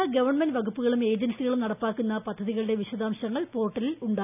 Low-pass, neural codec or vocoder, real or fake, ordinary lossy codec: 3.6 kHz; none; real; none